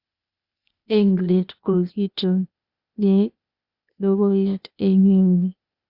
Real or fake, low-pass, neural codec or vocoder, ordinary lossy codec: fake; 5.4 kHz; codec, 16 kHz, 0.8 kbps, ZipCodec; Opus, 64 kbps